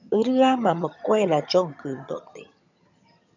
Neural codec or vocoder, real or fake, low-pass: vocoder, 22.05 kHz, 80 mel bands, HiFi-GAN; fake; 7.2 kHz